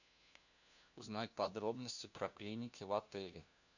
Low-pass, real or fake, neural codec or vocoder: 7.2 kHz; fake; codec, 16 kHz, 1 kbps, FunCodec, trained on LibriTTS, 50 frames a second